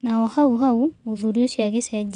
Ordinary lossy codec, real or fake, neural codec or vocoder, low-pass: none; fake; vocoder, 22.05 kHz, 80 mel bands, WaveNeXt; 9.9 kHz